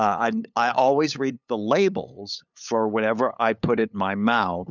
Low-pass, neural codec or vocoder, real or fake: 7.2 kHz; codec, 16 kHz, 8 kbps, FunCodec, trained on LibriTTS, 25 frames a second; fake